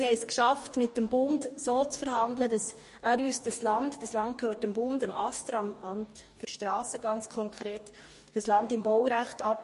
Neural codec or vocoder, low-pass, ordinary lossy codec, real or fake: codec, 44.1 kHz, 2.6 kbps, DAC; 14.4 kHz; MP3, 48 kbps; fake